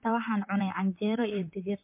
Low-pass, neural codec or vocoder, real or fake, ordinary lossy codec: 3.6 kHz; vocoder, 44.1 kHz, 128 mel bands, Pupu-Vocoder; fake; MP3, 32 kbps